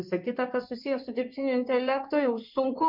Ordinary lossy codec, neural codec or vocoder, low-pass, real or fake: MP3, 48 kbps; codec, 16 kHz in and 24 kHz out, 2.2 kbps, FireRedTTS-2 codec; 5.4 kHz; fake